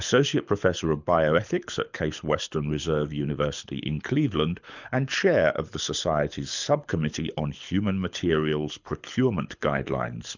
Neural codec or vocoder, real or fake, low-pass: codec, 24 kHz, 6 kbps, HILCodec; fake; 7.2 kHz